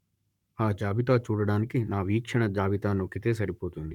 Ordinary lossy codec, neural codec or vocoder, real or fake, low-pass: none; codec, 44.1 kHz, 7.8 kbps, Pupu-Codec; fake; 19.8 kHz